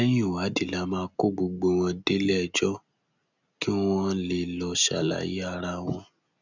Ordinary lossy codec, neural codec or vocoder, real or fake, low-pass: none; none; real; 7.2 kHz